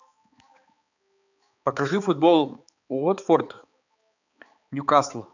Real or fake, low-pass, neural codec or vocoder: fake; 7.2 kHz; codec, 16 kHz, 4 kbps, X-Codec, HuBERT features, trained on balanced general audio